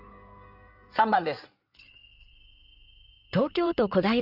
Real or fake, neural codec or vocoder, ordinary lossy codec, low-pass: fake; codec, 16 kHz in and 24 kHz out, 2.2 kbps, FireRedTTS-2 codec; none; 5.4 kHz